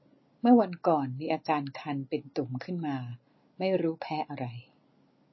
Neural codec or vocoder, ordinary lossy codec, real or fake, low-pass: none; MP3, 24 kbps; real; 7.2 kHz